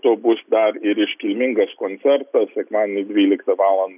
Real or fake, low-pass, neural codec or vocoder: real; 3.6 kHz; none